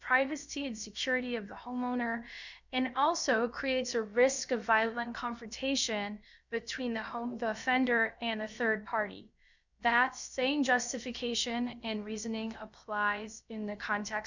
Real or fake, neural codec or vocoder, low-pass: fake; codec, 16 kHz, about 1 kbps, DyCAST, with the encoder's durations; 7.2 kHz